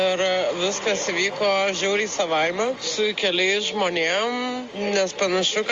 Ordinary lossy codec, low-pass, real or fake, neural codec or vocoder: Opus, 32 kbps; 7.2 kHz; real; none